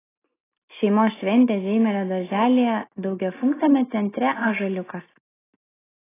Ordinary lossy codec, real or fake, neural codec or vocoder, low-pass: AAC, 16 kbps; real; none; 3.6 kHz